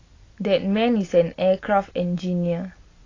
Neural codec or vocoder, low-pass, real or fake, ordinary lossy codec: none; 7.2 kHz; real; AAC, 32 kbps